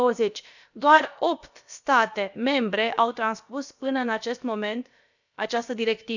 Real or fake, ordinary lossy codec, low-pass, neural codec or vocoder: fake; none; 7.2 kHz; codec, 16 kHz, about 1 kbps, DyCAST, with the encoder's durations